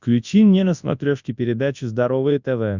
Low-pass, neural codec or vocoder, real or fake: 7.2 kHz; codec, 24 kHz, 0.9 kbps, WavTokenizer, large speech release; fake